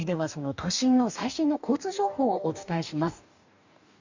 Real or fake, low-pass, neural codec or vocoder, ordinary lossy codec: fake; 7.2 kHz; codec, 44.1 kHz, 2.6 kbps, DAC; none